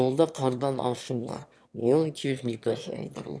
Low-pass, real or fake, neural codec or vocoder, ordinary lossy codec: none; fake; autoencoder, 22.05 kHz, a latent of 192 numbers a frame, VITS, trained on one speaker; none